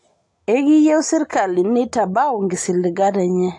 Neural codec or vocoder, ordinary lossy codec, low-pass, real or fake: none; AAC, 64 kbps; 10.8 kHz; real